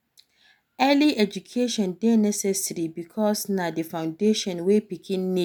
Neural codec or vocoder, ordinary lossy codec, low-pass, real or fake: none; none; none; real